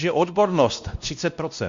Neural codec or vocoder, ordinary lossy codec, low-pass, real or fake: codec, 16 kHz, 1 kbps, X-Codec, WavLM features, trained on Multilingual LibriSpeech; Opus, 64 kbps; 7.2 kHz; fake